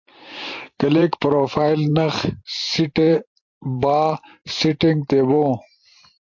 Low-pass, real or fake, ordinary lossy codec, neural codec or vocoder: 7.2 kHz; fake; MP3, 48 kbps; vocoder, 44.1 kHz, 128 mel bands every 512 samples, BigVGAN v2